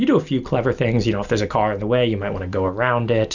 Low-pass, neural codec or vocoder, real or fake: 7.2 kHz; none; real